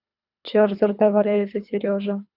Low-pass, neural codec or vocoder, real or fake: 5.4 kHz; codec, 24 kHz, 3 kbps, HILCodec; fake